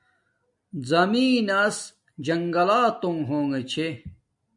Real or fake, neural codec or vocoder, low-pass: real; none; 10.8 kHz